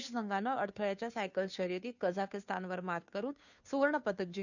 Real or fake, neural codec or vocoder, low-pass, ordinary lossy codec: fake; codec, 16 kHz, 2 kbps, FunCodec, trained on Chinese and English, 25 frames a second; 7.2 kHz; none